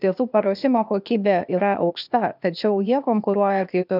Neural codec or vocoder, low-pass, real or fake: codec, 16 kHz, 0.8 kbps, ZipCodec; 5.4 kHz; fake